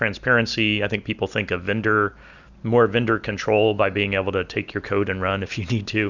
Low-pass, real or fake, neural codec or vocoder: 7.2 kHz; real; none